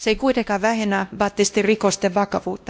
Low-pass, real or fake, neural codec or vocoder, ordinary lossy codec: none; fake; codec, 16 kHz, 1 kbps, X-Codec, WavLM features, trained on Multilingual LibriSpeech; none